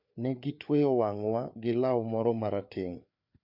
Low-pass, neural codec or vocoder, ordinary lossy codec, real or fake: 5.4 kHz; codec, 16 kHz, 4 kbps, FreqCodec, larger model; MP3, 48 kbps; fake